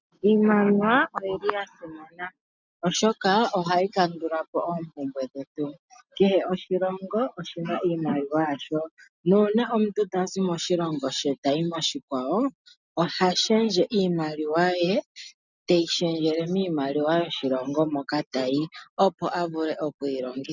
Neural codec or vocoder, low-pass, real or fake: none; 7.2 kHz; real